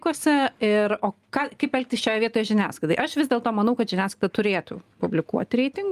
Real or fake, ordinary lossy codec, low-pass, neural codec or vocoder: real; Opus, 32 kbps; 14.4 kHz; none